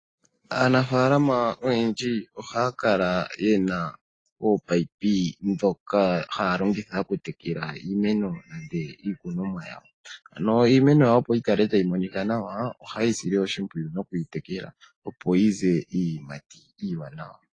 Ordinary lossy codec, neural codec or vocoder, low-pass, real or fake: AAC, 32 kbps; none; 9.9 kHz; real